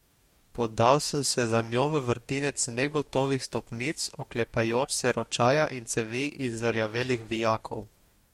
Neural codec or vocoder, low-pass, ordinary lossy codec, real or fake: codec, 44.1 kHz, 2.6 kbps, DAC; 19.8 kHz; MP3, 64 kbps; fake